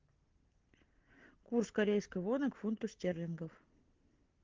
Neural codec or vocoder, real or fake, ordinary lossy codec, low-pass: none; real; Opus, 16 kbps; 7.2 kHz